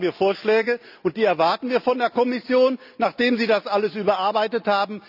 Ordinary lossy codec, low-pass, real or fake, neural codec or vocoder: none; 5.4 kHz; real; none